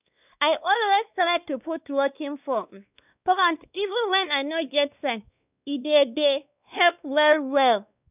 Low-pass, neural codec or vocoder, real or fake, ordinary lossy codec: 3.6 kHz; codec, 16 kHz, 2 kbps, X-Codec, WavLM features, trained on Multilingual LibriSpeech; fake; none